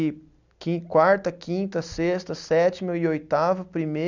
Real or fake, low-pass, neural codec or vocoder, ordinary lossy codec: real; 7.2 kHz; none; none